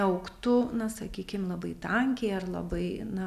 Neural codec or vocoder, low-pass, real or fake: none; 14.4 kHz; real